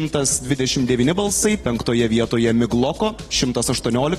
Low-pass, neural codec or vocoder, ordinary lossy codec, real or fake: 19.8 kHz; none; AAC, 32 kbps; real